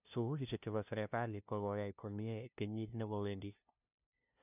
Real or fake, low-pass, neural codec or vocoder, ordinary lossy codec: fake; 3.6 kHz; codec, 16 kHz, 0.5 kbps, FunCodec, trained on LibriTTS, 25 frames a second; none